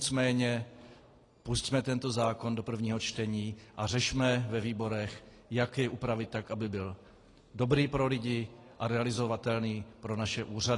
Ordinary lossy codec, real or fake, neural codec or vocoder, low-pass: AAC, 32 kbps; real; none; 10.8 kHz